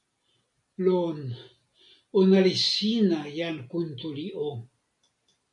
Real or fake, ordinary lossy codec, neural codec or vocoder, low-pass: real; MP3, 48 kbps; none; 10.8 kHz